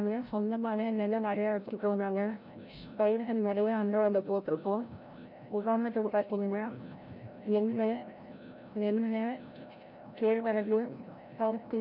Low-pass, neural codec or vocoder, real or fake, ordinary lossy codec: 5.4 kHz; codec, 16 kHz, 0.5 kbps, FreqCodec, larger model; fake; none